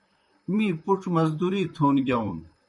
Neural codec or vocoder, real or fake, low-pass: vocoder, 44.1 kHz, 128 mel bands, Pupu-Vocoder; fake; 10.8 kHz